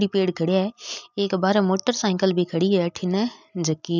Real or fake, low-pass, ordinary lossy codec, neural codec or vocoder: real; 7.2 kHz; none; none